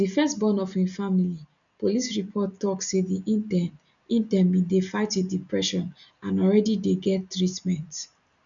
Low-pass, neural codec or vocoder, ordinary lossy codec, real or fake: 7.2 kHz; none; none; real